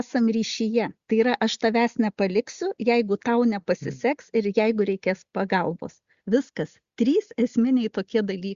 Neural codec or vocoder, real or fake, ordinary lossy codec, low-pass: none; real; Opus, 64 kbps; 7.2 kHz